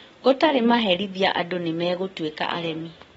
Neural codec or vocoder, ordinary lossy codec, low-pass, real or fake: none; AAC, 24 kbps; 19.8 kHz; real